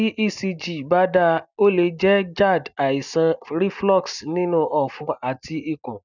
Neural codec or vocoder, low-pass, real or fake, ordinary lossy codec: none; 7.2 kHz; real; none